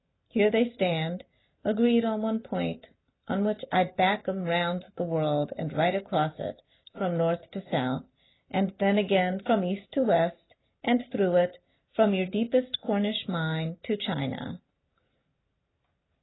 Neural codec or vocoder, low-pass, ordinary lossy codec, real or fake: none; 7.2 kHz; AAC, 16 kbps; real